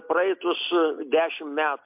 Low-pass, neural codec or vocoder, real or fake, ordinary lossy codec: 3.6 kHz; none; real; MP3, 32 kbps